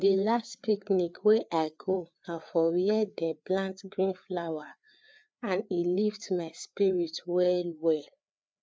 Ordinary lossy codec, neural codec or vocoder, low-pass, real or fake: none; codec, 16 kHz, 4 kbps, FreqCodec, larger model; none; fake